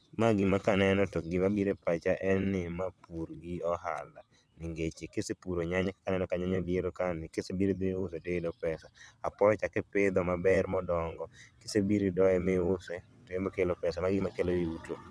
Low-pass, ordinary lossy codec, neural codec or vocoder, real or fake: none; none; vocoder, 22.05 kHz, 80 mel bands, WaveNeXt; fake